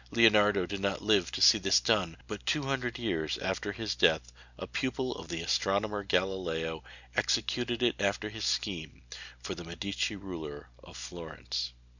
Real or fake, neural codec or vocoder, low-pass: real; none; 7.2 kHz